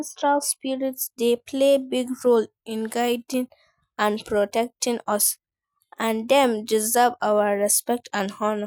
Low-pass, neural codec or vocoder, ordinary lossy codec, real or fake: none; none; none; real